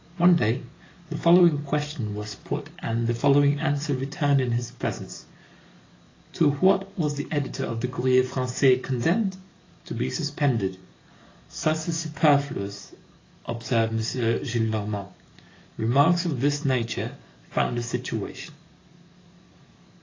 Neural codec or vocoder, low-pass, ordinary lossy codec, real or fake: codec, 44.1 kHz, 7.8 kbps, DAC; 7.2 kHz; AAC, 32 kbps; fake